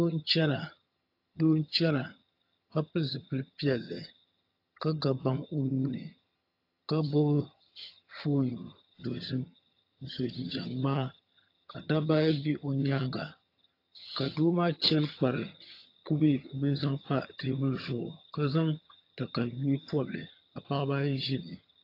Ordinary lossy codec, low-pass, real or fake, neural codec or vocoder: AAC, 32 kbps; 5.4 kHz; fake; vocoder, 22.05 kHz, 80 mel bands, HiFi-GAN